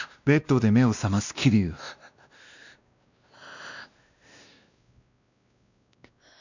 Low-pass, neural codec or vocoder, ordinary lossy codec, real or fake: 7.2 kHz; codec, 16 kHz, 1 kbps, X-Codec, WavLM features, trained on Multilingual LibriSpeech; none; fake